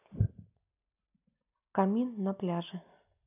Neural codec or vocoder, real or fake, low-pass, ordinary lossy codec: none; real; 3.6 kHz; none